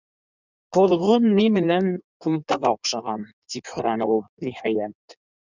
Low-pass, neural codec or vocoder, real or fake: 7.2 kHz; codec, 16 kHz in and 24 kHz out, 1.1 kbps, FireRedTTS-2 codec; fake